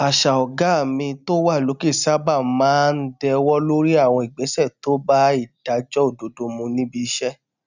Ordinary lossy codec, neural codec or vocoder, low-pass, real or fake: none; none; 7.2 kHz; real